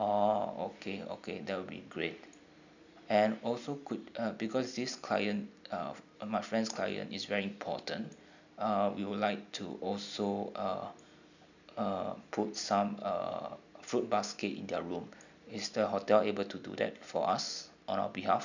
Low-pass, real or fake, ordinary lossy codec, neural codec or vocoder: 7.2 kHz; real; none; none